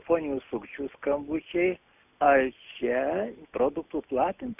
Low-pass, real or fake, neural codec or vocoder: 3.6 kHz; real; none